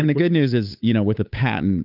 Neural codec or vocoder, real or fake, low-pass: codec, 16 kHz, 8 kbps, FunCodec, trained on Chinese and English, 25 frames a second; fake; 5.4 kHz